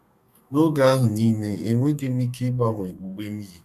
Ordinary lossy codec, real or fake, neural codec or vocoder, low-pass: none; fake; codec, 32 kHz, 1.9 kbps, SNAC; 14.4 kHz